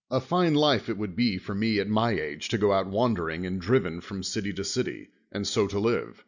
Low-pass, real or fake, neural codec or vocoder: 7.2 kHz; real; none